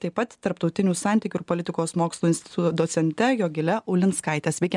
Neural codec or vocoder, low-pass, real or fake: none; 10.8 kHz; real